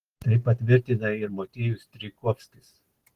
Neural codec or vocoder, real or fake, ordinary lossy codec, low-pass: none; real; Opus, 16 kbps; 14.4 kHz